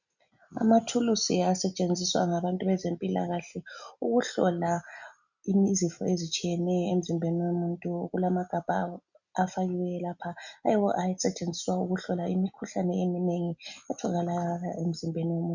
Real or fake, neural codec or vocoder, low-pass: real; none; 7.2 kHz